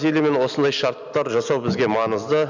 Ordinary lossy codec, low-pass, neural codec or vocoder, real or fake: none; 7.2 kHz; none; real